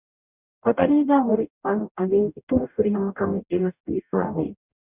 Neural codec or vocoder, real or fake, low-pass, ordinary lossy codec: codec, 44.1 kHz, 0.9 kbps, DAC; fake; 3.6 kHz; Opus, 64 kbps